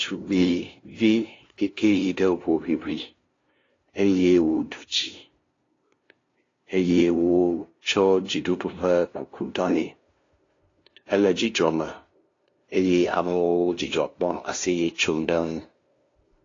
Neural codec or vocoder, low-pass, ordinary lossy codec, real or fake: codec, 16 kHz, 0.5 kbps, FunCodec, trained on LibriTTS, 25 frames a second; 7.2 kHz; AAC, 32 kbps; fake